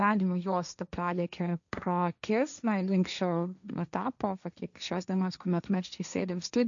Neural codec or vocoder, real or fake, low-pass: codec, 16 kHz, 1.1 kbps, Voila-Tokenizer; fake; 7.2 kHz